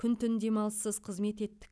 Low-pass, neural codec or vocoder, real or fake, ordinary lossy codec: none; none; real; none